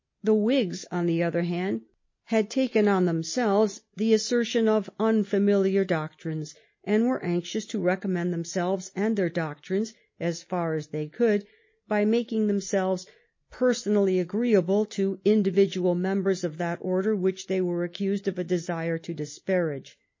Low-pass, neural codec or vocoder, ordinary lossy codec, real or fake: 7.2 kHz; none; MP3, 32 kbps; real